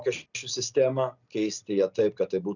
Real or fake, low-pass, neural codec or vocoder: real; 7.2 kHz; none